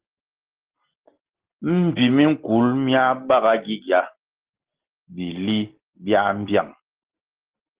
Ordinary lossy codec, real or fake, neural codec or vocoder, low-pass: Opus, 16 kbps; real; none; 3.6 kHz